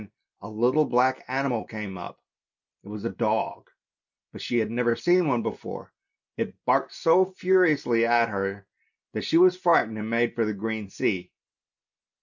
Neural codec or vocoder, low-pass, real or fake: none; 7.2 kHz; real